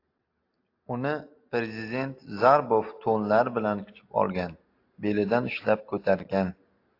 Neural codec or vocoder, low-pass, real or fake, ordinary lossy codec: none; 5.4 kHz; real; AAC, 32 kbps